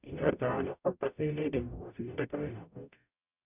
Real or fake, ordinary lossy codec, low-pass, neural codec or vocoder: fake; none; 3.6 kHz; codec, 44.1 kHz, 0.9 kbps, DAC